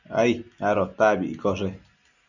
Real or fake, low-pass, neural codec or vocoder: real; 7.2 kHz; none